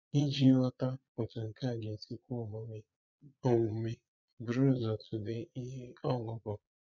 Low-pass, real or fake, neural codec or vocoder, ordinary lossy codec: 7.2 kHz; fake; vocoder, 22.05 kHz, 80 mel bands, Vocos; none